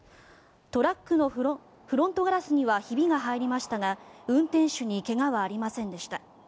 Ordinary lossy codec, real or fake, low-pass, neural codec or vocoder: none; real; none; none